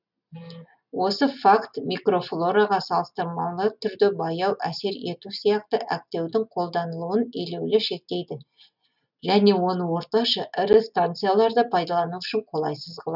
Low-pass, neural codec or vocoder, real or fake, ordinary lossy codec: 5.4 kHz; none; real; none